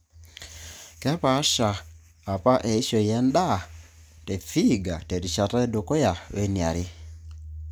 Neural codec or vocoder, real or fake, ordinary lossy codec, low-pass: none; real; none; none